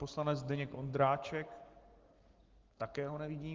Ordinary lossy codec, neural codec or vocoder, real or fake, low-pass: Opus, 16 kbps; none; real; 7.2 kHz